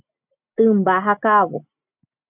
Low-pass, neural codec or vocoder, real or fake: 3.6 kHz; none; real